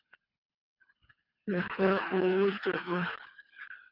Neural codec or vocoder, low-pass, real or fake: codec, 24 kHz, 3 kbps, HILCodec; 5.4 kHz; fake